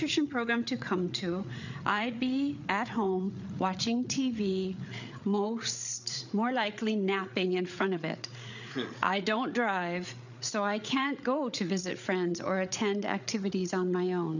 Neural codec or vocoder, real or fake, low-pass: codec, 16 kHz, 8 kbps, FreqCodec, larger model; fake; 7.2 kHz